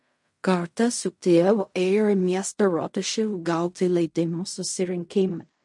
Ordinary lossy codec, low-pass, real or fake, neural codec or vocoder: MP3, 48 kbps; 10.8 kHz; fake; codec, 16 kHz in and 24 kHz out, 0.4 kbps, LongCat-Audio-Codec, fine tuned four codebook decoder